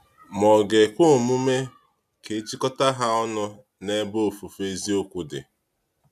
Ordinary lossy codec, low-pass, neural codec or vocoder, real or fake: none; 14.4 kHz; none; real